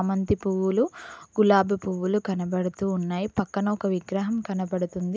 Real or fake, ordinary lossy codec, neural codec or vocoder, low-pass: real; none; none; none